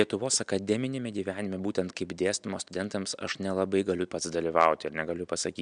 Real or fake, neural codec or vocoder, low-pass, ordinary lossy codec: real; none; 9.9 kHz; MP3, 96 kbps